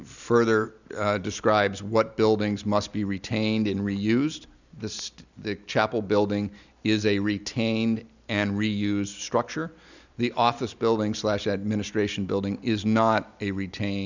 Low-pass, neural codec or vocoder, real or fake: 7.2 kHz; none; real